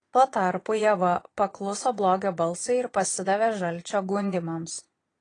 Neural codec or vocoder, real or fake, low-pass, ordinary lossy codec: vocoder, 22.05 kHz, 80 mel bands, Vocos; fake; 9.9 kHz; AAC, 32 kbps